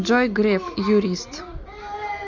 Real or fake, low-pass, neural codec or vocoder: real; 7.2 kHz; none